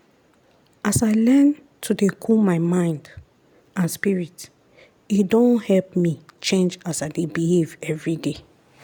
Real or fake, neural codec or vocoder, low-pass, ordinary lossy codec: real; none; none; none